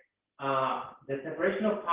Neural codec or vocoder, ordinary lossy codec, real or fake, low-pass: none; Opus, 16 kbps; real; 3.6 kHz